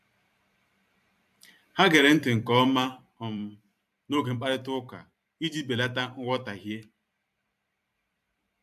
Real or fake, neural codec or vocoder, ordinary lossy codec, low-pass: fake; vocoder, 44.1 kHz, 128 mel bands every 512 samples, BigVGAN v2; AAC, 96 kbps; 14.4 kHz